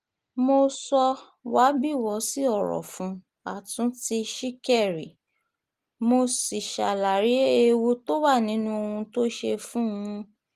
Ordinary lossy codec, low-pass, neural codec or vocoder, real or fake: Opus, 24 kbps; 14.4 kHz; none; real